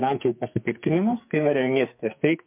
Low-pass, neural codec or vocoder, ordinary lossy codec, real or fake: 3.6 kHz; codec, 44.1 kHz, 2.6 kbps, SNAC; MP3, 32 kbps; fake